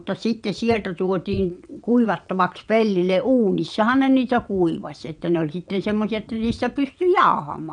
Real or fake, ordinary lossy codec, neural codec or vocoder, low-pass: fake; none; vocoder, 22.05 kHz, 80 mel bands, WaveNeXt; 9.9 kHz